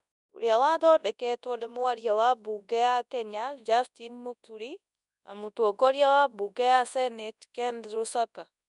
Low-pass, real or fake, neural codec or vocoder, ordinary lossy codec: 10.8 kHz; fake; codec, 24 kHz, 0.9 kbps, WavTokenizer, large speech release; none